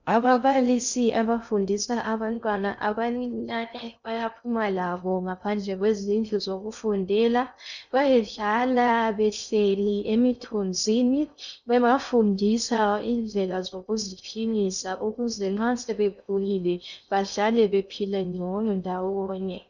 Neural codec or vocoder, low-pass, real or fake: codec, 16 kHz in and 24 kHz out, 0.6 kbps, FocalCodec, streaming, 2048 codes; 7.2 kHz; fake